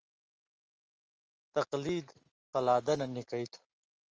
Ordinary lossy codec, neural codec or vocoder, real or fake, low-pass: Opus, 24 kbps; none; real; 7.2 kHz